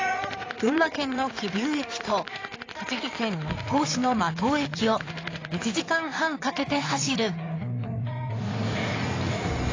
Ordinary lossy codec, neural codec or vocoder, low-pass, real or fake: AAC, 32 kbps; codec, 16 kHz in and 24 kHz out, 2.2 kbps, FireRedTTS-2 codec; 7.2 kHz; fake